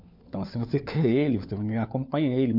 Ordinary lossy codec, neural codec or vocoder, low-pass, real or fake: MP3, 48 kbps; codec, 16 kHz, 4 kbps, FunCodec, trained on LibriTTS, 50 frames a second; 5.4 kHz; fake